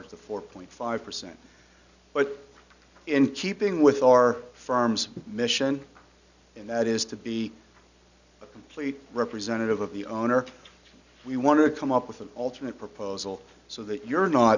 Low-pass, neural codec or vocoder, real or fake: 7.2 kHz; none; real